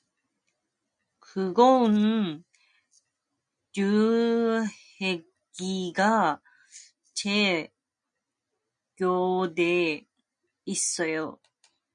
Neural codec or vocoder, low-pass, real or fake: none; 9.9 kHz; real